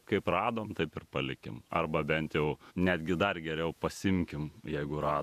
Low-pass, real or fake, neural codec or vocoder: 14.4 kHz; fake; vocoder, 48 kHz, 128 mel bands, Vocos